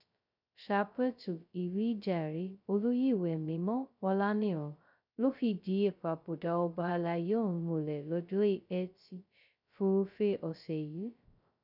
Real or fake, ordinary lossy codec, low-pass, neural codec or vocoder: fake; none; 5.4 kHz; codec, 16 kHz, 0.2 kbps, FocalCodec